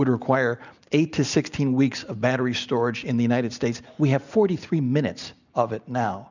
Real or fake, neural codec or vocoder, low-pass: real; none; 7.2 kHz